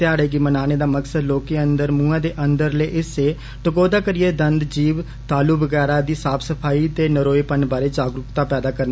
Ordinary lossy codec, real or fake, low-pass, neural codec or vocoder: none; real; none; none